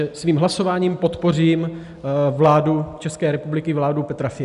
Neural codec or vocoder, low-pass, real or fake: none; 10.8 kHz; real